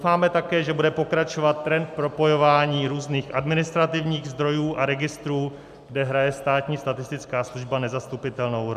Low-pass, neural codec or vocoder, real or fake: 14.4 kHz; none; real